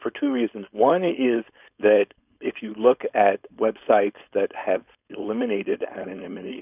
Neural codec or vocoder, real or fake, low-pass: codec, 16 kHz, 4.8 kbps, FACodec; fake; 3.6 kHz